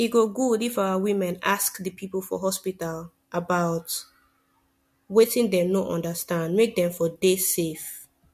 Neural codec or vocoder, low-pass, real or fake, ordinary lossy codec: none; 14.4 kHz; real; MP3, 64 kbps